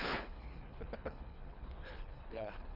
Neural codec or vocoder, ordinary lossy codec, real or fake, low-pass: codec, 24 kHz, 3 kbps, HILCodec; none; fake; 5.4 kHz